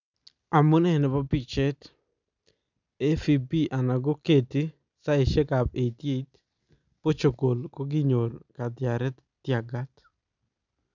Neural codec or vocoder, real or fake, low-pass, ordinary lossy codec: none; real; 7.2 kHz; none